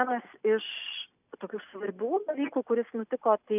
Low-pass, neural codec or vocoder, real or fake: 3.6 kHz; none; real